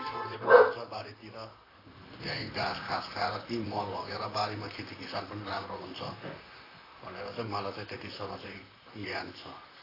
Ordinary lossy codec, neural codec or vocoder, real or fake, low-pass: AAC, 24 kbps; vocoder, 44.1 kHz, 128 mel bands, Pupu-Vocoder; fake; 5.4 kHz